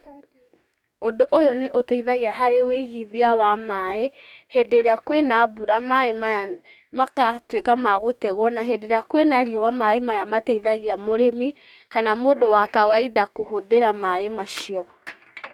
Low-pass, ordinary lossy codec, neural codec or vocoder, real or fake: 19.8 kHz; none; codec, 44.1 kHz, 2.6 kbps, DAC; fake